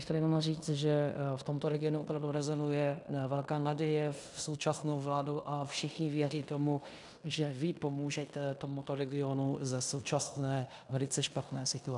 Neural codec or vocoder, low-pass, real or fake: codec, 16 kHz in and 24 kHz out, 0.9 kbps, LongCat-Audio-Codec, fine tuned four codebook decoder; 10.8 kHz; fake